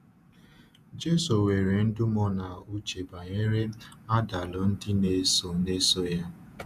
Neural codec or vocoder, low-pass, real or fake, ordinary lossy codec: vocoder, 48 kHz, 128 mel bands, Vocos; 14.4 kHz; fake; none